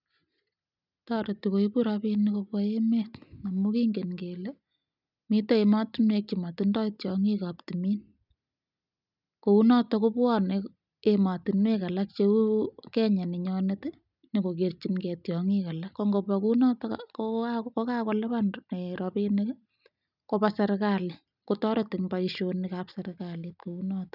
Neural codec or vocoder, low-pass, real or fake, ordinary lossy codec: none; 5.4 kHz; real; none